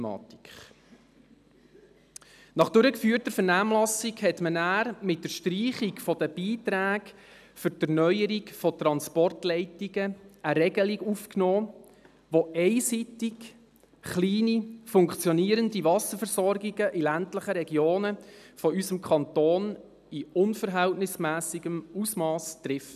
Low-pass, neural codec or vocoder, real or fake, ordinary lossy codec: 14.4 kHz; none; real; none